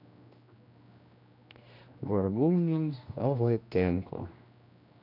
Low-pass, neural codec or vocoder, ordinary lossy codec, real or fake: 5.4 kHz; codec, 16 kHz, 1 kbps, X-Codec, HuBERT features, trained on general audio; none; fake